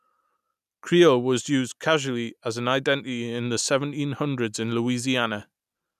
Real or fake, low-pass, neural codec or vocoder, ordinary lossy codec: real; 14.4 kHz; none; none